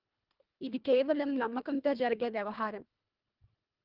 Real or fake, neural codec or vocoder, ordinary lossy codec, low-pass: fake; codec, 24 kHz, 1.5 kbps, HILCodec; Opus, 32 kbps; 5.4 kHz